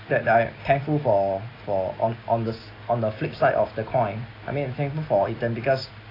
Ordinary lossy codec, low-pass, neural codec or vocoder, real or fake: AAC, 24 kbps; 5.4 kHz; none; real